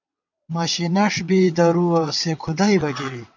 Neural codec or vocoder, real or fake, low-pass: vocoder, 22.05 kHz, 80 mel bands, WaveNeXt; fake; 7.2 kHz